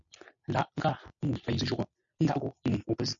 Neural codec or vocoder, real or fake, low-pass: none; real; 7.2 kHz